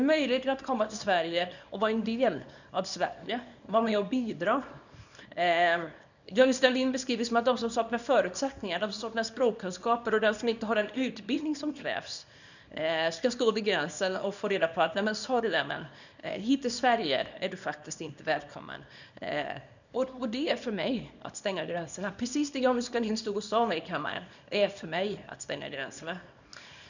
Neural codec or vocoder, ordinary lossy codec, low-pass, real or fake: codec, 24 kHz, 0.9 kbps, WavTokenizer, small release; none; 7.2 kHz; fake